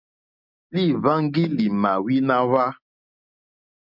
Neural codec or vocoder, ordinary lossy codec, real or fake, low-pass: none; MP3, 48 kbps; real; 5.4 kHz